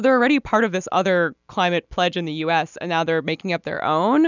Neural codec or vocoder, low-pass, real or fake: none; 7.2 kHz; real